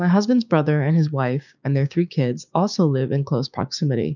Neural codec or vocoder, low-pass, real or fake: codec, 16 kHz, 6 kbps, DAC; 7.2 kHz; fake